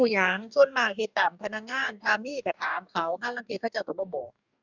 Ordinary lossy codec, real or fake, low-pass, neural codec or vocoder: none; fake; 7.2 kHz; codec, 44.1 kHz, 2.6 kbps, DAC